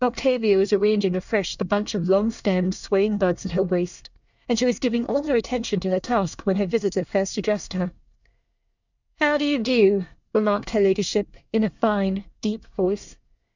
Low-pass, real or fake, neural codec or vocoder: 7.2 kHz; fake; codec, 24 kHz, 1 kbps, SNAC